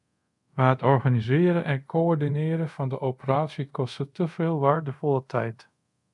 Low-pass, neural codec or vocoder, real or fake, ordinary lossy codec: 10.8 kHz; codec, 24 kHz, 0.5 kbps, DualCodec; fake; MP3, 96 kbps